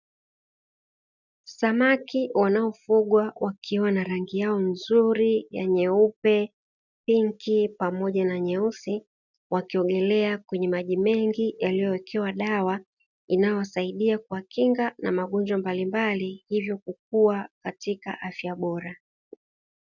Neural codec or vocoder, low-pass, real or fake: none; 7.2 kHz; real